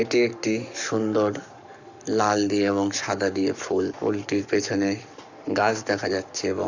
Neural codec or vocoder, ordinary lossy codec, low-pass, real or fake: codec, 44.1 kHz, 7.8 kbps, Pupu-Codec; none; 7.2 kHz; fake